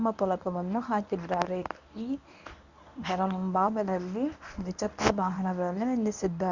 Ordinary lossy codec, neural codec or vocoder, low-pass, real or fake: Opus, 64 kbps; codec, 24 kHz, 0.9 kbps, WavTokenizer, medium speech release version 1; 7.2 kHz; fake